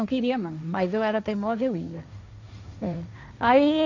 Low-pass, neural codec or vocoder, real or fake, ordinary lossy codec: 7.2 kHz; codec, 16 kHz, 1.1 kbps, Voila-Tokenizer; fake; none